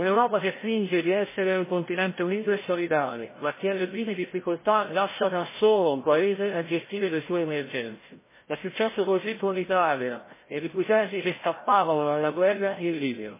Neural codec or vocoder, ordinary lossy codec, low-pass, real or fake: codec, 16 kHz, 0.5 kbps, FreqCodec, larger model; MP3, 16 kbps; 3.6 kHz; fake